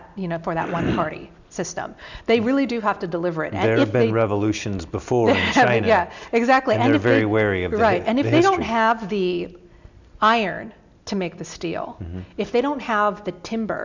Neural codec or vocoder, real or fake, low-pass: none; real; 7.2 kHz